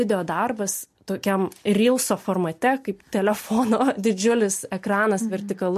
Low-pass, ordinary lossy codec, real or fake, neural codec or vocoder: 14.4 kHz; MP3, 64 kbps; real; none